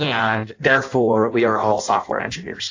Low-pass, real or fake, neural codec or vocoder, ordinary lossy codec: 7.2 kHz; fake; codec, 16 kHz in and 24 kHz out, 0.6 kbps, FireRedTTS-2 codec; AAC, 48 kbps